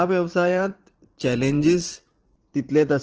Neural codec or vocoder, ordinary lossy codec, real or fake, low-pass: vocoder, 44.1 kHz, 80 mel bands, Vocos; Opus, 16 kbps; fake; 7.2 kHz